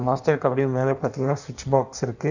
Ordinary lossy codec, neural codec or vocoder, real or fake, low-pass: none; codec, 16 kHz in and 24 kHz out, 1.1 kbps, FireRedTTS-2 codec; fake; 7.2 kHz